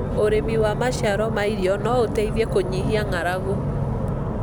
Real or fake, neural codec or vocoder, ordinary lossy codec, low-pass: real; none; none; none